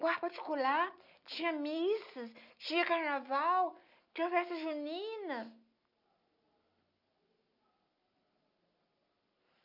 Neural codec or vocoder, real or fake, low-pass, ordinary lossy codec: none; real; 5.4 kHz; none